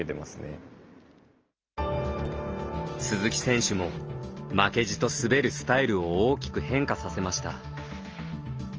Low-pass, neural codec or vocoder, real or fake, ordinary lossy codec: 7.2 kHz; none; real; Opus, 24 kbps